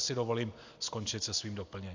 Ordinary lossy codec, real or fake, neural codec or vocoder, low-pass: AAC, 48 kbps; real; none; 7.2 kHz